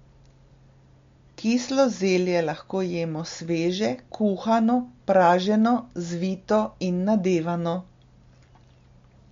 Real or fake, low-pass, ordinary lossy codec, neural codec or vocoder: real; 7.2 kHz; MP3, 48 kbps; none